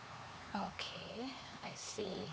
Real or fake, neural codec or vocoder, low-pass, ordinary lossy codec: fake; codec, 16 kHz, 4 kbps, X-Codec, HuBERT features, trained on LibriSpeech; none; none